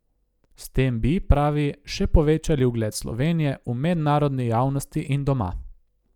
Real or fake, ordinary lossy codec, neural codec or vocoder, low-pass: real; none; none; 19.8 kHz